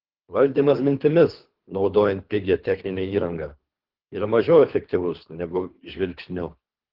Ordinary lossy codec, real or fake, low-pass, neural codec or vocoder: Opus, 16 kbps; fake; 5.4 kHz; codec, 24 kHz, 3 kbps, HILCodec